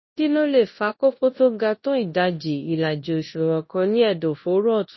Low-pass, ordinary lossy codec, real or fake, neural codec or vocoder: 7.2 kHz; MP3, 24 kbps; fake; codec, 24 kHz, 0.9 kbps, WavTokenizer, large speech release